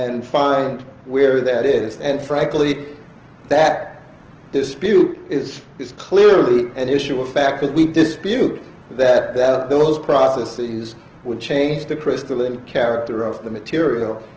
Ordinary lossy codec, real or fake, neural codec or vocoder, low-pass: Opus, 16 kbps; real; none; 7.2 kHz